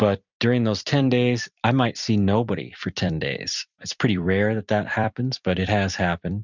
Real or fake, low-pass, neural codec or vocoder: fake; 7.2 kHz; vocoder, 44.1 kHz, 128 mel bands every 256 samples, BigVGAN v2